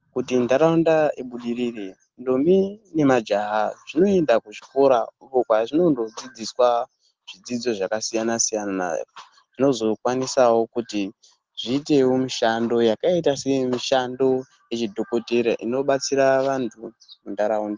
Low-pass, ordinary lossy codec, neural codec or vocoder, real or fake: 7.2 kHz; Opus, 16 kbps; none; real